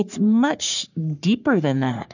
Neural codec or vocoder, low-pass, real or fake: codec, 44.1 kHz, 3.4 kbps, Pupu-Codec; 7.2 kHz; fake